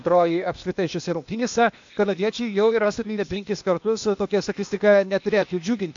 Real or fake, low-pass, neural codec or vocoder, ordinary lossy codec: fake; 7.2 kHz; codec, 16 kHz, 0.8 kbps, ZipCodec; AAC, 64 kbps